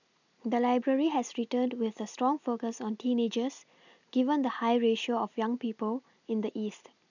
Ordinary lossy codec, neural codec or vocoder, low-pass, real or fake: none; none; 7.2 kHz; real